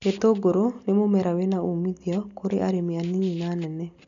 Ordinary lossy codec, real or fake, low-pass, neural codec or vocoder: MP3, 96 kbps; real; 7.2 kHz; none